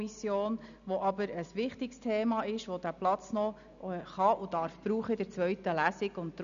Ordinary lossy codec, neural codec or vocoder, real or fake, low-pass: none; none; real; 7.2 kHz